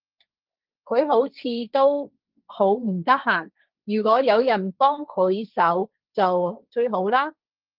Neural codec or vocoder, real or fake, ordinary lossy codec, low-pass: codec, 16 kHz, 1.1 kbps, Voila-Tokenizer; fake; Opus, 24 kbps; 5.4 kHz